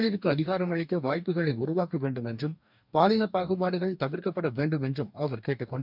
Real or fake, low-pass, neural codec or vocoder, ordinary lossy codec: fake; 5.4 kHz; codec, 44.1 kHz, 2.6 kbps, DAC; none